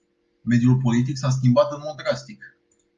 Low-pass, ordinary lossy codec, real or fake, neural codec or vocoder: 7.2 kHz; Opus, 32 kbps; real; none